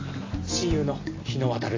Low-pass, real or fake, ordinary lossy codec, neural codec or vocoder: 7.2 kHz; real; AAC, 32 kbps; none